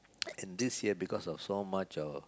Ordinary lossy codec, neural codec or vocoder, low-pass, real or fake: none; none; none; real